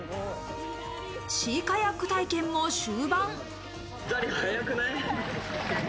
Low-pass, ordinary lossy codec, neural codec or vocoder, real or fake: none; none; none; real